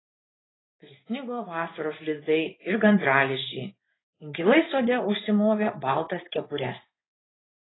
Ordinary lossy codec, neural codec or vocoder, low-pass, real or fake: AAC, 16 kbps; codec, 16 kHz in and 24 kHz out, 1 kbps, XY-Tokenizer; 7.2 kHz; fake